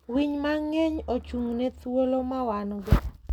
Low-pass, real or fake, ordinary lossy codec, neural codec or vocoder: 19.8 kHz; real; none; none